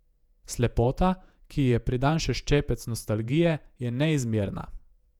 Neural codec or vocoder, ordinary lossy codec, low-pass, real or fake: vocoder, 48 kHz, 128 mel bands, Vocos; none; 19.8 kHz; fake